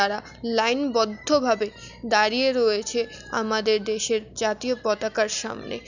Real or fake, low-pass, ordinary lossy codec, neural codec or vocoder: real; 7.2 kHz; none; none